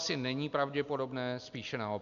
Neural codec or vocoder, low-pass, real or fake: none; 7.2 kHz; real